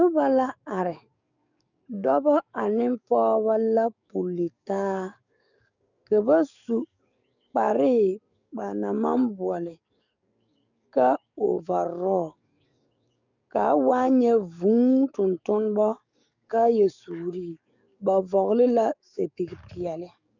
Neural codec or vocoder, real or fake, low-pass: codec, 44.1 kHz, 7.8 kbps, DAC; fake; 7.2 kHz